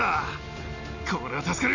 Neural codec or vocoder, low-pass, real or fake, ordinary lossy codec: none; 7.2 kHz; real; none